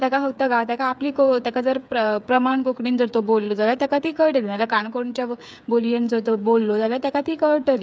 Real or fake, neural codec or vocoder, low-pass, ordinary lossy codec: fake; codec, 16 kHz, 8 kbps, FreqCodec, smaller model; none; none